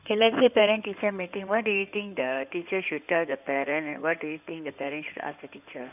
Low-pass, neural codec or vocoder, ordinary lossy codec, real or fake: 3.6 kHz; codec, 16 kHz in and 24 kHz out, 2.2 kbps, FireRedTTS-2 codec; none; fake